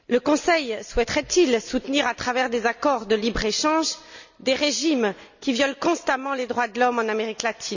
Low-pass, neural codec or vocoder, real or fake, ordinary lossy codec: 7.2 kHz; none; real; none